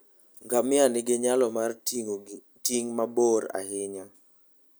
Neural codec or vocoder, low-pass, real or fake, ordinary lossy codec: none; none; real; none